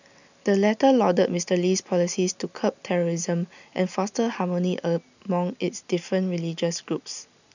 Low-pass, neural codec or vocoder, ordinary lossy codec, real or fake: 7.2 kHz; none; none; real